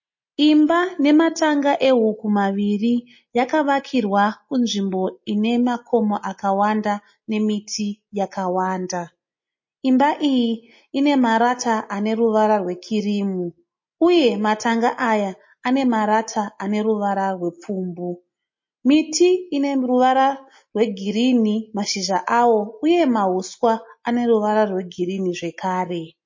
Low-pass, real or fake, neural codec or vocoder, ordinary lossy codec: 7.2 kHz; real; none; MP3, 32 kbps